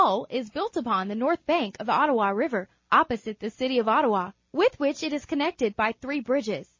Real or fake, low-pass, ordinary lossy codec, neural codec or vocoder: real; 7.2 kHz; MP3, 32 kbps; none